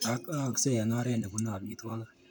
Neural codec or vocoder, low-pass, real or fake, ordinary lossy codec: vocoder, 44.1 kHz, 128 mel bands, Pupu-Vocoder; none; fake; none